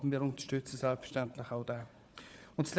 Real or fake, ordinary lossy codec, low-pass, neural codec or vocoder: fake; none; none; codec, 16 kHz, 4 kbps, FunCodec, trained on Chinese and English, 50 frames a second